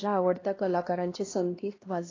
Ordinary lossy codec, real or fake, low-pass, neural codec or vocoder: AAC, 32 kbps; fake; 7.2 kHz; codec, 16 kHz, 1 kbps, X-Codec, HuBERT features, trained on LibriSpeech